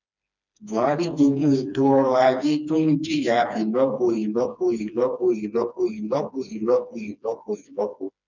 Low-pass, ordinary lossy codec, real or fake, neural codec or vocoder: 7.2 kHz; none; fake; codec, 16 kHz, 2 kbps, FreqCodec, smaller model